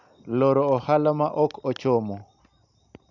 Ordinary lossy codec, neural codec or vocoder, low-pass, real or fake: none; none; 7.2 kHz; real